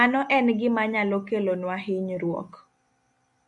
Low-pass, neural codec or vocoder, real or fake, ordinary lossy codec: 10.8 kHz; none; real; AAC, 64 kbps